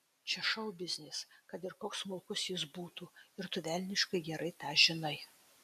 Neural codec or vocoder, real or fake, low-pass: none; real; 14.4 kHz